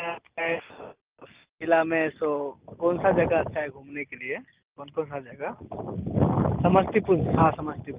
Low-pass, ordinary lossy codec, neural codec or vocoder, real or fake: 3.6 kHz; Opus, 32 kbps; none; real